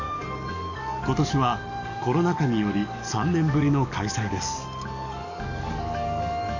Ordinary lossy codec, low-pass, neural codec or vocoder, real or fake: none; 7.2 kHz; codec, 44.1 kHz, 7.8 kbps, DAC; fake